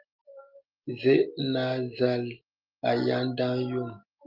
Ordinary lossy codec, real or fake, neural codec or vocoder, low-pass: Opus, 32 kbps; real; none; 5.4 kHz